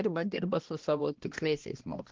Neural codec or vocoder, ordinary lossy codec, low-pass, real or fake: codec, 16 kHz, 1 kbps, X-Codec, HuBERT features, trained on balanced general audio; Opus, 16 kbps; 7.2 kHz; fake